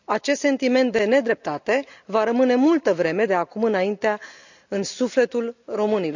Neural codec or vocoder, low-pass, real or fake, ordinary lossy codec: none; 7.2 kHz; real; none